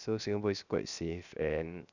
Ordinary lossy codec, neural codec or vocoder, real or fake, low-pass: none; codec, 16 kHz, 0.7 kbps, FocalCodec; fake; 7.2 kHz